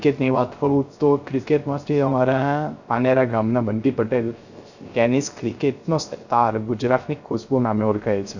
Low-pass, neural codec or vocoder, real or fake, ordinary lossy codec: 7.2 kHz; codec, 16 kHz, 0.3 kbps, FocalCodec; fake; none